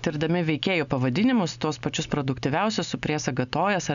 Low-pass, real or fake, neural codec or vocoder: 7.2 kHz; real; none